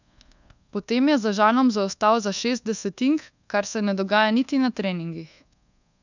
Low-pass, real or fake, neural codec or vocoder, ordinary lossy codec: 7.2 kHz; fake; codec, 24 kHz, 1.2 kbps, DualCodec; none